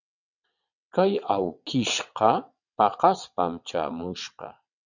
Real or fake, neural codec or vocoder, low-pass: fake; vocoder, 22.05 kHz, 80 mel bands, WaveNeXt; 7.2 kHz